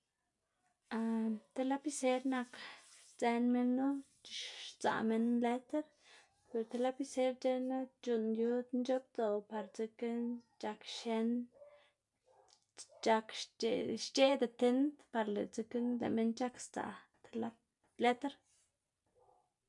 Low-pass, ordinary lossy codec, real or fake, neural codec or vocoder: 10.8 kHz; none; real; none